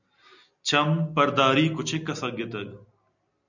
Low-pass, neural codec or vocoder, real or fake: 7.2 kHz; none; real